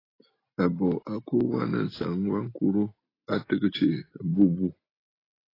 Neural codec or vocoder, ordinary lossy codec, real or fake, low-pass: none; AAC, 24 kbps; real; 5.4 kHz